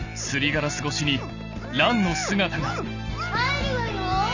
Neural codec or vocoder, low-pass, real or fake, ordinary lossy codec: none; 7.2 kHz; real; none